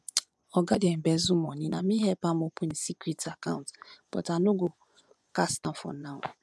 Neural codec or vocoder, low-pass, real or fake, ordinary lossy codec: vocoder, 24 kHz, 100 mel bands, Vocos; none; fake; none